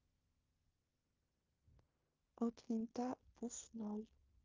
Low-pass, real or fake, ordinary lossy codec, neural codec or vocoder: 7.2 kHz; fake; Opus, 24 kbps; codec, 16 kHz in and 24 kHz out, 0.9 kbps, LongCat-Audio-Codec, fine tuned four codebook decoder